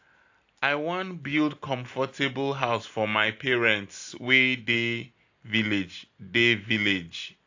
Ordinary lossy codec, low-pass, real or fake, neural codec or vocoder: AAC, 48 kbps; 7.2 kHz; real; none